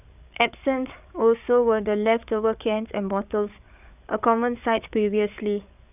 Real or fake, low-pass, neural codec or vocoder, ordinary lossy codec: fake; 3.6 kHz; codec, 16 kHz, 8 kbps, FreqCodec, larger model; none